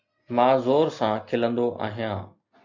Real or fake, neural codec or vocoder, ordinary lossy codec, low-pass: real; none; MP3, 64 kbps; 7.2 kHz